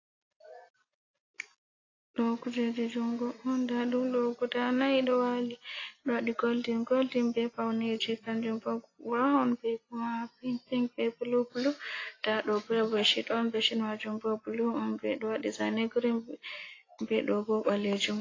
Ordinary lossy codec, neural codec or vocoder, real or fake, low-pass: AAC, 32 kbps; none; real; 7.2 kHz